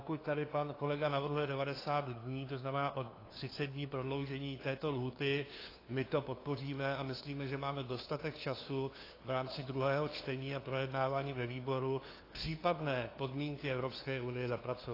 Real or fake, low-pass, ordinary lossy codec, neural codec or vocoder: fake; 5.4 kHz; AAC, 24 kbps; codec, 16 kHz, 2 kbps, FunCodec, trained on LibriTTS, 25 frames a second